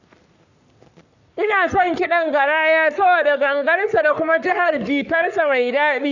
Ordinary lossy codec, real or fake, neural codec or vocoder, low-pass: none; fake; codec, 44.1 kHz, 3.4 kbps, Pupu-Codec; 7.2 kHz